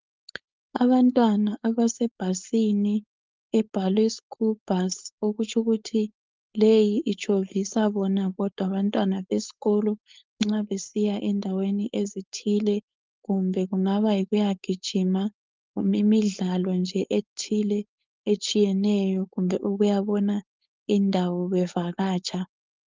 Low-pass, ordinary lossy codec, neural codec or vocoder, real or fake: 7.2 kHz; Opus, 32 kbps; codec, 16 kHz, 4.8 kbps, FACodec; fake